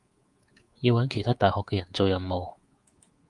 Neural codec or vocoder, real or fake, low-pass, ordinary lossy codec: codec, 24 kHz, 3.1 kbps, DualCodec; fake; 10.8 kHz; Opus, 32 kbps